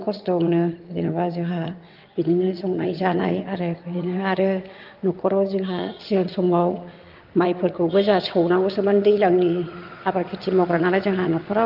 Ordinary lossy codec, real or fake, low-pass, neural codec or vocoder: Opus, 32 kbps; fake; 5.4 kHz; vocoder, 22.05 kHz, 80 mel bands, WaveNeXt